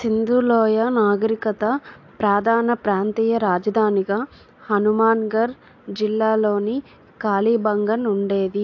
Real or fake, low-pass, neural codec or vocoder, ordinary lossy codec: real; 7.2 kHz; none; AAC, 48 kbps